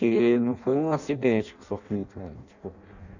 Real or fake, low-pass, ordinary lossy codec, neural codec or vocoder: fake; 7.2 kHz; none; codec, 16 kHz in and 24 kHz out, 0.6 kbps, FireRedTTS-2 codec